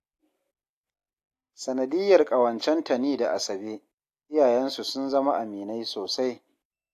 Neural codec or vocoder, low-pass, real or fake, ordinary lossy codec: none; 14.4 kHz; real; AAC, 64 kbps